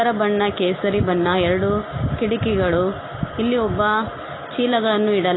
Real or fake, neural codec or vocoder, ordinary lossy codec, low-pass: real; none; AAC, 16 kbps; 7.2 kHz